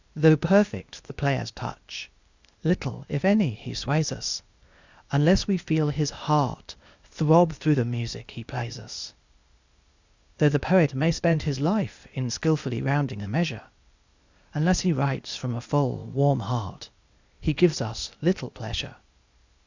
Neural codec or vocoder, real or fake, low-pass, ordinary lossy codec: codec, 16 kHz, 0.8 kbps, ZipCodec; fake; 7.2 kHz; Opus, 64 kbps